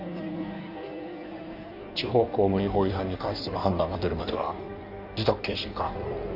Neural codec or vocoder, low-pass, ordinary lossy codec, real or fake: codec, 16 kHz in and 24 kHz out, 1.1 kbps, FireRedTTS-2 codec; 5.4 kHz; none; fake